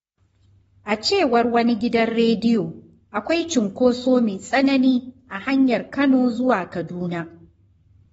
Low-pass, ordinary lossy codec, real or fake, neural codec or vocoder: 19.8 kHz; AAC, 24 kbps; fake; codec, 44.1 kHz, 7.8 kbps, Pupu-Codec